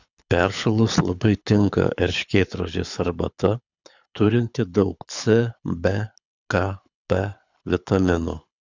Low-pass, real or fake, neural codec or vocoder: 7.2 kHz; fake; codec, 24 kHz, 6 kbps, HILCodec